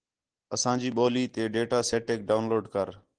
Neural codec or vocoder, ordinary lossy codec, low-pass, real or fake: none; Opus, 16 kbps; 9.9 kHz; real